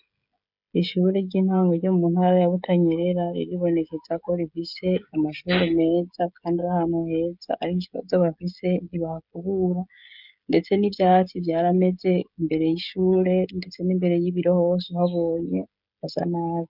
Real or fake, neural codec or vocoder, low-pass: fake; codec, 16 kHz, 16 kbps, FreqCodec, smaller model; 5.4 kHz